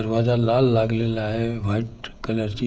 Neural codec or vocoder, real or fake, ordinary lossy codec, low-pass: codec, 16 kHz, 16 kbps, FreqCodec, smaller model; fake; none; none